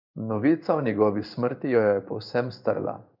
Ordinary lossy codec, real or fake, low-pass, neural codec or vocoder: none; fake; 5.4 kHz; codec, 16 kHz in and 24 kHz out, 1 kbps, XY-Tokenizer